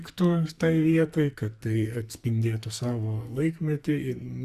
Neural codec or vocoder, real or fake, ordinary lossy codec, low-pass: codec, 44.1 kHz, 2.6 kbps, SNAC; fake; Opus, 64 kbps; 14.4 kHz